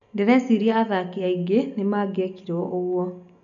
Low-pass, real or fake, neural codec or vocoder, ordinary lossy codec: 7.2 kHz; real; none; none